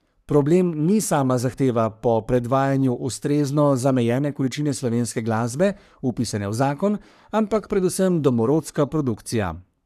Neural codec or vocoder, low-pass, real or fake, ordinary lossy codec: codec, 44.1 kHz, 3.4 kbps, Pupu-Codec; 14.4 kHz; fake; none